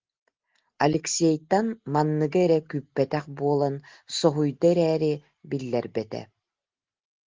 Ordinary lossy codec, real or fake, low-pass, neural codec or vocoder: Opus, 16 kbps; real; 7.2 kHz; none